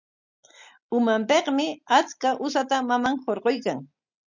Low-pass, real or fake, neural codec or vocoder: 7.2 kHz; real; none